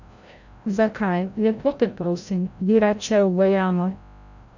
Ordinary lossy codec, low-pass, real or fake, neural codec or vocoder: none; 7.2 kHz; fake; codec, 16 kHz, 0.5 kbps, FreqCodec, larger model